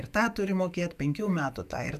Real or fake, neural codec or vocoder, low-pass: fake; autoencoder, 48 kHz, 128 numbers a frame, DAC-VAE, trained on Japanese speech; 14.4 kHz